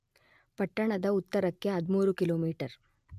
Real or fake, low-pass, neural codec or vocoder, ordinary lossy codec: real; 14.4 kHz; none; MP3, 96 kbps